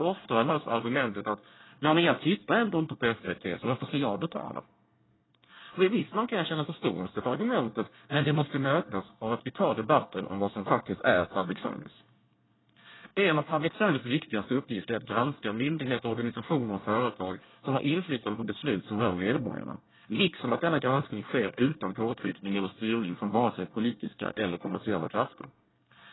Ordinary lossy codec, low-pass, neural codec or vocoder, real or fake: AAC, 16 kbps; 7.2 kHz; codec, 24 kHz, 1 kbps, SNAC; fake